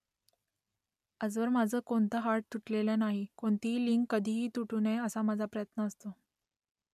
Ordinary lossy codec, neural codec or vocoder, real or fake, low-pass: none; none; real; 14.4 kHz